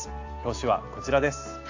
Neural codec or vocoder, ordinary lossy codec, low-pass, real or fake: none; AAC, 48 kbps; 7.2 kHz; real